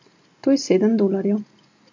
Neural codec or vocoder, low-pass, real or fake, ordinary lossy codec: none; 7.2 kHz; real; MP3, 48 kbps